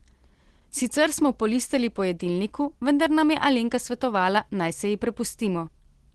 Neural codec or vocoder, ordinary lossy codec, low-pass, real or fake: none; Opus, 16 kbps; 10.8 kHz; real